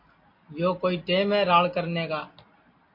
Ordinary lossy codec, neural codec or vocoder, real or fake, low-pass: MP3, 32 kbps; none; real; 5.4 kHz